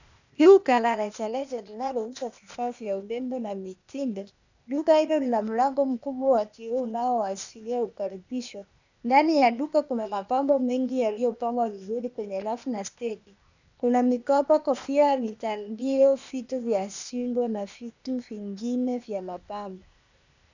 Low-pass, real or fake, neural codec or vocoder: 7.2 kHz; fake; codec, 16 kHz, 0.8 kbps, ZipCodec